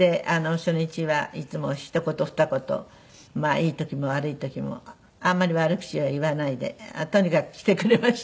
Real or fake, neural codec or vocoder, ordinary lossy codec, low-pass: real; none; none; none